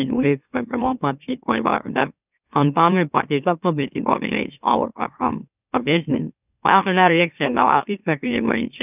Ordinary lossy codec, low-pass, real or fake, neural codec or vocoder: none; 3.6 kHz; fake; autoencoder, 44.1 kHz, a latent of 192 numbers a frame, MeloTTS